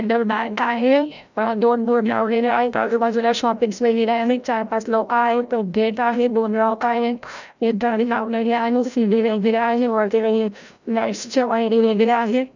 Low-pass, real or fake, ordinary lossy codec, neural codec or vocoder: 7.2 kHz; fake; none; codec, 16 kHz, 0.5 kbps, FreqCodec, larger model